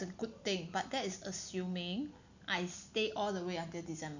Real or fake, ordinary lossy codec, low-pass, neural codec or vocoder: real; none; 7.2 kHz; none